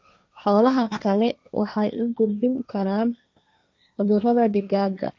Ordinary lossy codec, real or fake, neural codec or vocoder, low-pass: none; fake; codec, 16 kHz, 1.1 kbps, Voila-Tokenizer; none